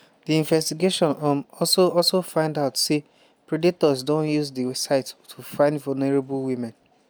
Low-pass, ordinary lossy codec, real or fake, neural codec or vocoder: none; none; real; none